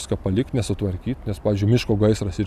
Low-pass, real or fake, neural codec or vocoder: 14.4 kHz; real; none